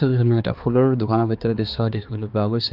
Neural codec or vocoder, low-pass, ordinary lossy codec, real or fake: codec, 16 kHz, 2 kbps, X-Codec, HuBERT features, trained on balanced general audio; 5.4 kHz; Opus, 32 kbps; fake